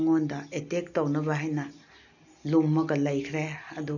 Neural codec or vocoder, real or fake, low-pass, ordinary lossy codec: none; real; 7.2 kHz; none